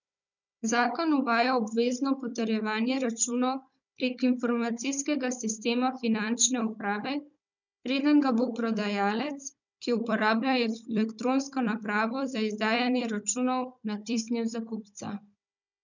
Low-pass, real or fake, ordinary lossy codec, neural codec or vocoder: 7.2 kHz; fake; none; codec, 16 kHz, 16 kbps, FunCodec, trained on Chinese and English, 50 frames a second